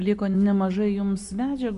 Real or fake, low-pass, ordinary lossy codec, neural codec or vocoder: real; 10.8 kHz; AAC, 96 kbps; none